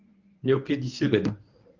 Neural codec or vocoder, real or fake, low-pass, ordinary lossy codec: codec, 24 kHz, 0.9 kbps, WavTokenizer, medium speech release version 1; fake; 7.2 kHz; Opus, 32 kbps